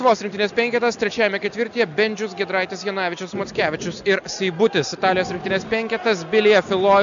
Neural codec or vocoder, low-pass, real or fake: none; 7.2 kHz; real